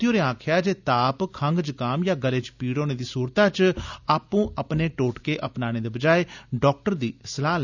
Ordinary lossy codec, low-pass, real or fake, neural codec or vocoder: none; 7.2 kHz; real; none